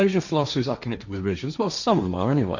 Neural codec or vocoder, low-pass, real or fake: codec, 16 kHz, 1.1 kbps, Voila-Tokenizer; 7.2 kHz; fake